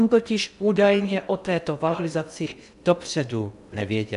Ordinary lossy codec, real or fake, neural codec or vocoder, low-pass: MP3, 96 kbps; fake; codec, 16 kHz in and 24 kHz out, 0.6 kbps, FocalCodec, streaming, 2048 codes; 10.8 kHz